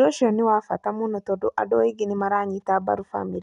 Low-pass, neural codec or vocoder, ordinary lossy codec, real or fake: 10.8 kHz; none; none; real